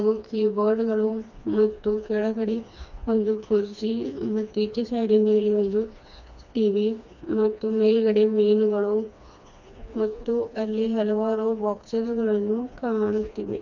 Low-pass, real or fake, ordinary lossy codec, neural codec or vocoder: 7.2 kHz; fake; none; codec, 16 kHz, 2 kbps, FreqCodec, smaller model